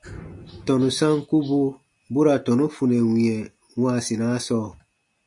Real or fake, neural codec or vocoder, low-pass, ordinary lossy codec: real; none; 10.8 kHz; MP3, 64 kbps